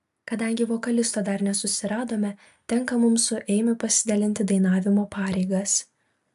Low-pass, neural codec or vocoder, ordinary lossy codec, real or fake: 14.4 kHz; none; AAC, 96 kbps; real